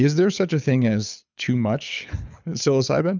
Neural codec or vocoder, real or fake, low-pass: codec, 24 kHz, 6 kbps, HILCodec; fake; 7.2 kHz